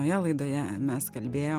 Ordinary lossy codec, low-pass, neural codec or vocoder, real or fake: Opus, 24 kbps; 14.4 kHz; none; real